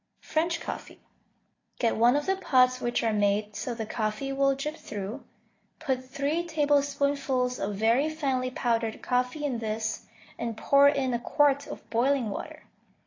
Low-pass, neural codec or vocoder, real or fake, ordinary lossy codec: 7.2 kHz; none; real; AAC, 32 kbps